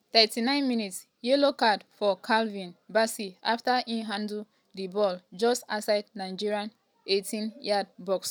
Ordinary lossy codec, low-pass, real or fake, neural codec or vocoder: none; none; real; none